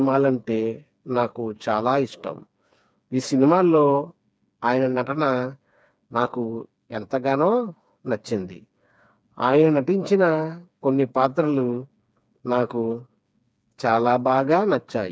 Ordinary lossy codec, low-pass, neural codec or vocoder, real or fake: none; none; codec, 16 kHz, 4 kbps, FreqCodec, smaller model; fake